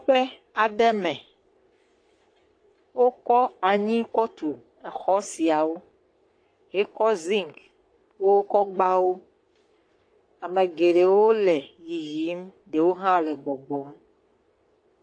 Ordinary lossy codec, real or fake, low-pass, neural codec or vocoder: AAC, 48 kbps; fake; 9.9 kHz; codec, 44.1 kHz, 3.4 kbps, Pupu-Codec